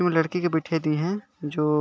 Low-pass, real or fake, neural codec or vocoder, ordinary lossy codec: none; real; none; none